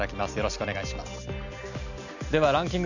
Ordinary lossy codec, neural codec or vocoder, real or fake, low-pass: none; none; real; 7.2 kHz